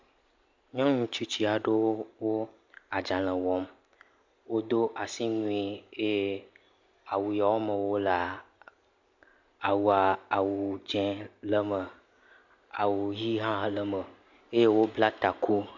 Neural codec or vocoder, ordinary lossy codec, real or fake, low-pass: none; MP3, 64 kbps; real; 7.2 kHz